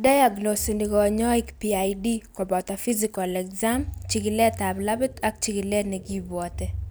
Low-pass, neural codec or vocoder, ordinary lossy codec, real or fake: none; none; none; real